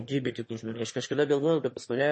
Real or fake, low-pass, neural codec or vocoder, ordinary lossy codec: fake; 9.9 kHz; autoencoder, 22.05 kHz, a latent of 192 numbers a frame, VITS, trained on one speaker; MP3, 32 kbps